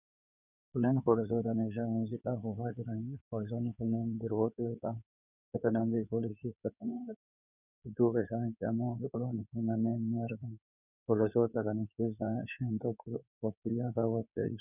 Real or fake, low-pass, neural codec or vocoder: fake; 3.6 kHz; codec, 16 kHz in and 24 kHz out, 2.2 kbps, FireRedTTS-2 codec